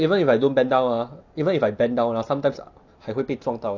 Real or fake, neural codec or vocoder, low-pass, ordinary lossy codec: real; none; 7.2 kHz; none